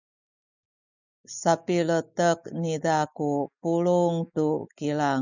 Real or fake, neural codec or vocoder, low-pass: real; none; 7.2 kHz